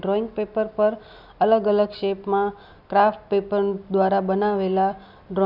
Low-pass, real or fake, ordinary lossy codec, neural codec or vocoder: 5.4 kHz; real; none; none